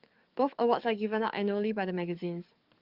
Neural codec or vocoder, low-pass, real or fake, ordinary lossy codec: codec, 44.1 kHz, 7.8 kbps, DAC; 5.4 kHz; fake; Opus, 24 kbps